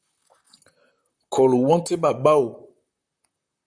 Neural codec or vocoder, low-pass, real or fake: vocoder, 44.1 kHz, 128 mel bands, Pupu-Vocoder; 9.9 kHz; fake